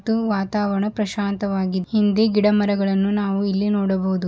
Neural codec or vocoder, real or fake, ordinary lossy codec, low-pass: none; real; none; none